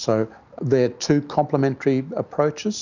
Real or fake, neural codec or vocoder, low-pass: real; none; 7.2 kHz